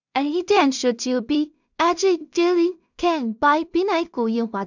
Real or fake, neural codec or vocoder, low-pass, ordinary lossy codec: fake; codec, 16 kHz in and 24 kHz out, 0.4 kbps, LongCat-Audio-Codec, two codebook decoder; 7.2 kHz; none